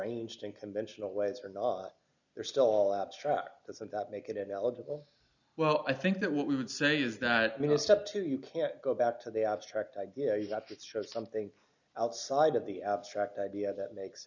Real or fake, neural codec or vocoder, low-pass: real; none; 7.2 kHz